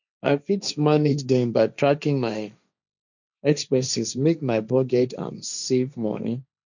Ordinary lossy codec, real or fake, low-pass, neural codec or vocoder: none; fake; 7.2 kHz; codec, 16 kHz, 1.1 kbps, Voila-Tokenizer